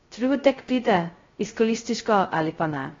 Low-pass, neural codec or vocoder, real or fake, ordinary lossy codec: 7.2 kHz; codec, 16 kHz, 0.2 kbps, FocalCodec; fake; AAC, 32 kbps